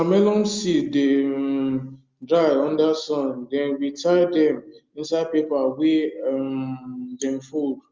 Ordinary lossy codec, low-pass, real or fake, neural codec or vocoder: Opus, 32 kbps; 7.2 kHz; real; none